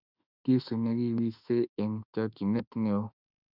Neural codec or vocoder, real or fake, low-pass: autoencoder, 48 kHz, 32 numbers a frame, DAC-VAE, trained on Japanese speech; fake; 5.4 kHz